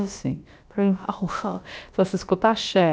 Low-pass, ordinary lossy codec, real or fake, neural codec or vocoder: none; none; fake; codec, 16 kHz, about 1 kbps, DyCAST, with the encoder's durations